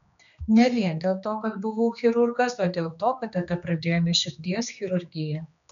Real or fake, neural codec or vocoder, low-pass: fake; codec, 16 kHz, 2 kbps, X-Codec, HuBERT features, trained on general audio; 7.2 kHz